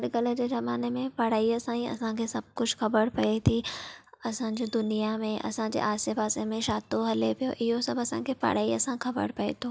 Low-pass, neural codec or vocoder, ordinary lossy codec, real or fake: none; none; none; real